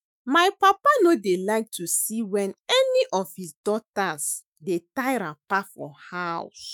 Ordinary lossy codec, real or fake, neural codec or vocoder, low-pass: none; fake; autoencoder, 48 kHz, 128 numbers a frame, DAC-VAE, trained on Japanese speech; none